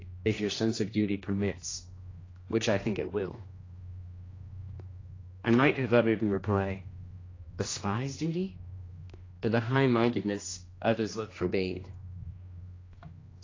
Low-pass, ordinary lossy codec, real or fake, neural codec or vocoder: 7.2 kHz; AAC, 32 kbps; fake; codec, 16 kHz, 1 kbps, X-Codec, HuBERT features, trained on general audio